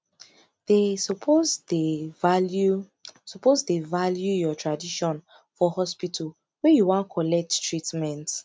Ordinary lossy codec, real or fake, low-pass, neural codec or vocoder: none; real; none; none